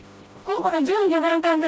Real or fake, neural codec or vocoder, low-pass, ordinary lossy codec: fake; codec, 16 kHz, 0.5 kbps, FreqCodec, smaller model; none; none